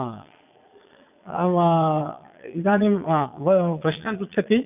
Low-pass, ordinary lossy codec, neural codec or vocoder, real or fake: 3.6 kHz; none; vocoder, 22.05 kHz, 80 mel bands, Vocos; fake